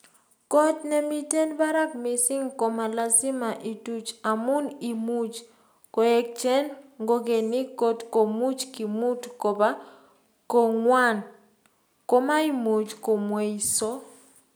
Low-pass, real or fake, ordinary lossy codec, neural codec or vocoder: none; real; none; none